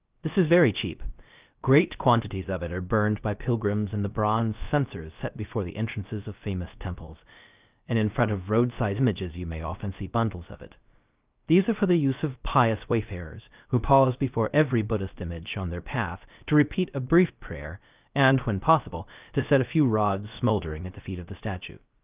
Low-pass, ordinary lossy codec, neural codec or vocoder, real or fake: 3.6 kHz; Opus, 24 kbps; codec, 16 kHz, 0.3 kbps, FocalCodec; fake